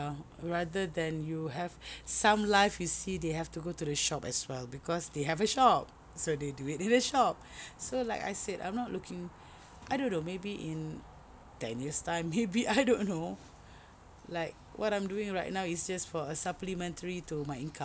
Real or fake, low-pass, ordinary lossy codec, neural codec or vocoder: real; none; none; none